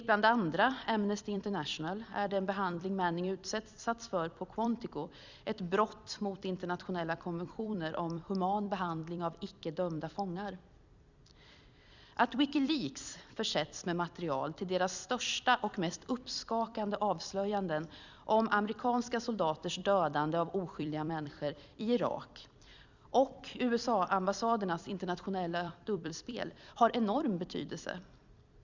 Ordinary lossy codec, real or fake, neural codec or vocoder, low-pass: Opus, 64 kbps; fake; vocoder, 44.1 kHz, 128 mel bands every 256 samples, BigVGAN v2; 7.2 kHz